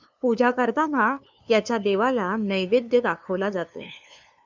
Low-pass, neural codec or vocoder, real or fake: 7.2 kHz; codec, 16 kHz, 2 kbps, FunCodec, trained on LibriTTS, 25 frames a second; fake